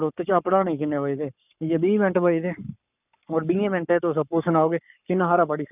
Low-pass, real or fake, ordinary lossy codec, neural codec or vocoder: 3.6 kHz; fake; none; codec, 44.1 kHz, 7.8 kbps, Pupu-Codec